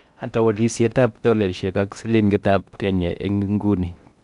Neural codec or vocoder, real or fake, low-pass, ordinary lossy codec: codec, 16 kHz in and 24 kHz out, 0.6 kbps, FocalCodec, streaming, 2048 codes; fake; 10.8 kHz; none